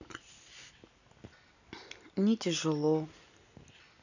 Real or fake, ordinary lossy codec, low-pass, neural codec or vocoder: real; none; 7.2 kHz; none